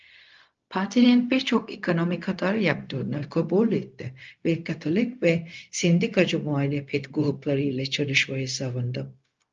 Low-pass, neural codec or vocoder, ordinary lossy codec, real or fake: 7.2 kHz; codec, 16 kHz, 0.4 kbps, LongCat-Audio-Codec; Opus, 24 kbps; fake